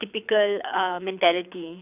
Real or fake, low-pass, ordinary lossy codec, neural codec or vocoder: fake; 3.6 kHz; none; codec, 24 kHz, 6 kbps, HILCodec